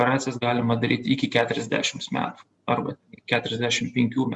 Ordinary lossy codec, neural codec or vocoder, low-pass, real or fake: Opus, 64 kbps; none; 10.8 kHz; real